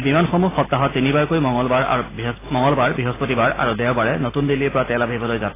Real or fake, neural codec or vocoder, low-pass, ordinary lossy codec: real; none; 3.6 kHz; AAC, 16 kbps